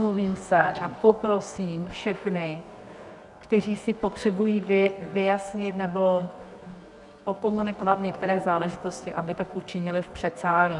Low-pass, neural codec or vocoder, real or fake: 10.8 kHz; codec, 24 kHz, 0.9 kbps, WavTokenizer, medium music audio release; fake